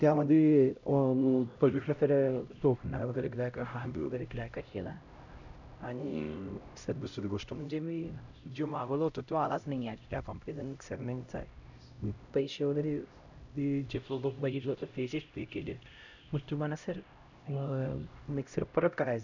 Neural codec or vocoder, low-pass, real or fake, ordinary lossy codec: codec, 16 kHz, 0.5 kbps, X-Codec, HuBERT features, trained on LibriSpeech; 7.2 kHz; fake; none